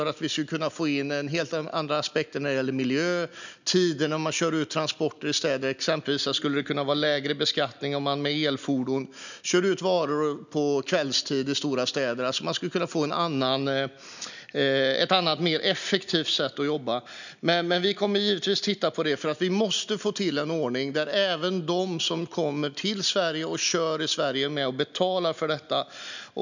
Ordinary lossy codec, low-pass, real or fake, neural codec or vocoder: none; 7.2 kHz; real; none